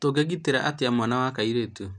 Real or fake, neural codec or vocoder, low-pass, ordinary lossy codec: real; none; 9.9 kHz; none